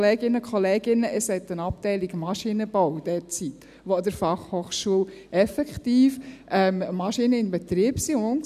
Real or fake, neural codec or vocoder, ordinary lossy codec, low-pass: real; none; none; 14.4 kHz